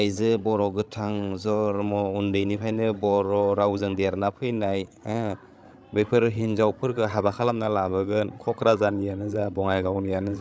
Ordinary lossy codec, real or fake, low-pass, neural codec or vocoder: none; fake; none; codec, 16 kHz, 16 kbps, FreqCodec, larger model